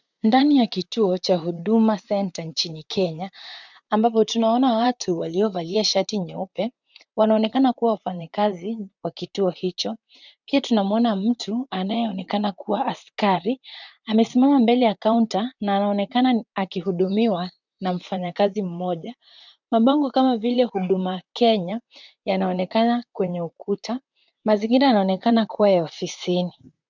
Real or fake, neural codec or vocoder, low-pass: fake; vocoder, 44.1 kHz, 128 mel bands, Pupu-Vocoder; 7.2 kHz